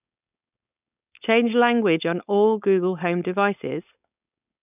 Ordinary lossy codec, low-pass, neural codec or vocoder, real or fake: none; 3.6 kHz; codec, 16 kHz, 4.8 kbps, FACodec; fake